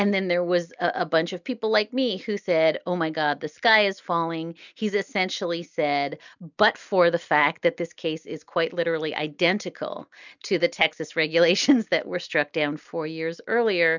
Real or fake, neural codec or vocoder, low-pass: real; none; 7.2 kHz